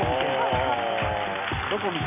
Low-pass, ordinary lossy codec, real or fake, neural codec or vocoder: 3.6 kHz; none; real; none